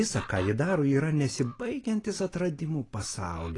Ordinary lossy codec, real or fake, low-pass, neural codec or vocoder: AAC, 32 kbps; real; 10.8 kHz; none